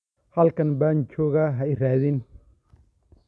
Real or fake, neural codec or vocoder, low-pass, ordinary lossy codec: real; none; none; none